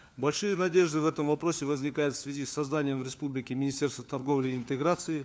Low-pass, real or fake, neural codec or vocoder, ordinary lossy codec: none; fake; codec, 16 kHz, 4 kbps, FunCodec, trained on LibriTTS, 50 frames a second; none